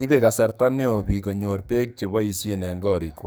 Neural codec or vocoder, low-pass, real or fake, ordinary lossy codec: codec, 44.1 kHz, 2.6 kbps, SNAC; none; fake; none